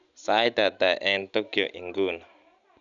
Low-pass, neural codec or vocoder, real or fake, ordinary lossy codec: 7.2 kHz; none; real; none